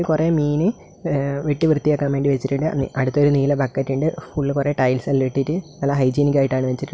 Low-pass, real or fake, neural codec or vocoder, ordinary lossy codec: none; real; none; none